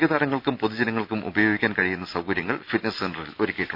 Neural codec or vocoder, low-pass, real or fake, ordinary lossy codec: none; 5.4 kHz; real; none